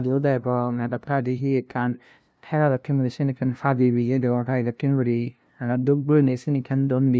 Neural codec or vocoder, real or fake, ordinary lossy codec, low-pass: codec, 16 kHz, 0.5 kbps, FunCodec, trained on LibriTTS, 25 frames a second; fake; none; none